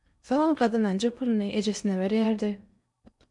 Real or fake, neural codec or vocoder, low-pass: fake; codec, 16 kHz in and 24 kHz out, 0.8 kbps, FocalCodec, streaming, 65536 codes; 10.8 kHz